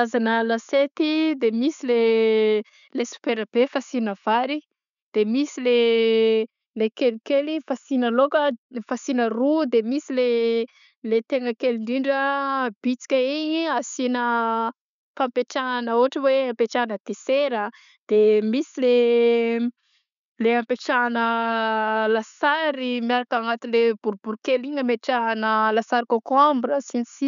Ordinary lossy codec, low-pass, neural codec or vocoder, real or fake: none; 7.2 kHz; none; real